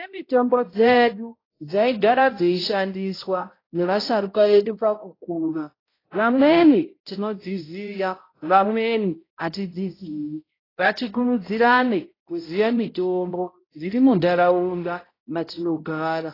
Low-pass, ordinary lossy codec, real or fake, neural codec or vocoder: 5.4 kHz; AAC, 24 kbps; fake; codec, 16 kHz, 0.5 kbps, X-Codec, HuBERT features, trained on balanced general audio